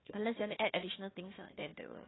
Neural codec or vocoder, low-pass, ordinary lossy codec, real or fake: codec, 16 kHz, 1 kbps, FunCodec, trained on Chinese and English, 50 frames a second; 7.2 kHz; AAC, 16 kbps; fake